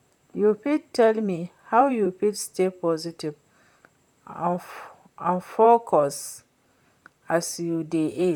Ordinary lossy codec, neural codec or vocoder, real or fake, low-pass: none; vocoder, 44.1 kHz, 128 mel bands, Pupu-Vocoder; fake; 19.8 kHz